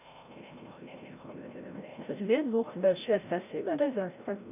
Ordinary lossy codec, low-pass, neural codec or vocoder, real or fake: none; 3.6 kHz; codec, 16 kHz, 0.5 kbps, FreqCodec, larger model; fake